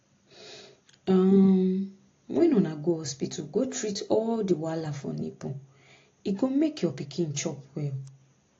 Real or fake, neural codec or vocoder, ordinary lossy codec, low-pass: real; none; AAC, 32 kbps; 7.2 kHz